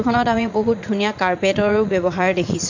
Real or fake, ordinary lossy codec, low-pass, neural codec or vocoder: fake; MP3, 64 kbps; 7.2 kHz; vocoder, 44.1 kHz, 80 mel bands, Vocos